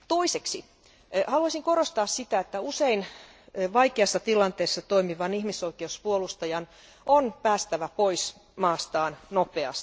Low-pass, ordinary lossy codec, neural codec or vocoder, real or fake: none; none; none; real